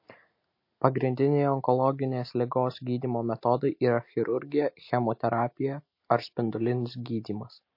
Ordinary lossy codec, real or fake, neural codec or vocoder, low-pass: MP3, 32 kbps; real; none; 5.4 kHz